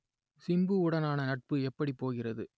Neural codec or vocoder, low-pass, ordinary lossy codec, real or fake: none; none; none; real